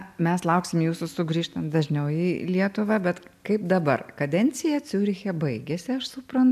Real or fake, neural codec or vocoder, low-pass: real; none; 14.4 kHz